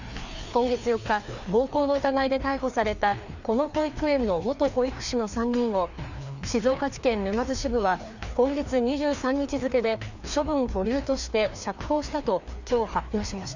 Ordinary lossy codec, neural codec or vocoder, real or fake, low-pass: none; codec, 16 kHz, 2 kbps, FreqCodec, larger model; fake; 7.2 kHz